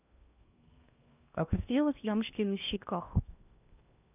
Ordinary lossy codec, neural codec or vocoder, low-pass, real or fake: none; codec, 16 kHz in and 24 kHz out, 0.8 kbps, FocalCodec, streaming, 65536 codes; 3.6 kHz; fake